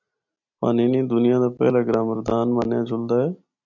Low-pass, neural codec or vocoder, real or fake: 7.2 kHz; none; real